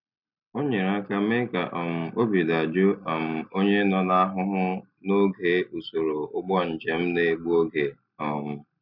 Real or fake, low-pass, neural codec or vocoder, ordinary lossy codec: real; 5.4 kHz; none; none